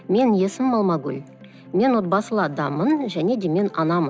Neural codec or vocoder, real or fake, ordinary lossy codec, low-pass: none; real; none; none